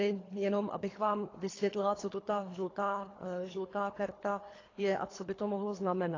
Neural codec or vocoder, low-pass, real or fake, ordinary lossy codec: codec, 24 kHz, 3 kbps, HILCodec; 7.2 kHz; fake; AAC, 32 kbps